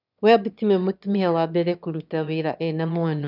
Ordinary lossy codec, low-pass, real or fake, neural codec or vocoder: none; 5.4 kHz; fake; autoencoder, 22.05 kHz, a latent of 192 numbers a frame, VITS, trained on one speaker